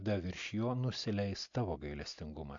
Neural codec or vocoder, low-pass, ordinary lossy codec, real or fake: none; 7.2 kHz; AAC, 64 kbps; real